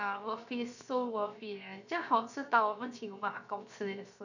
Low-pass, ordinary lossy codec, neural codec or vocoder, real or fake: 7.2 kHz; none; codec, 16 kHz, 0.7 kbps, FocalCodec; fake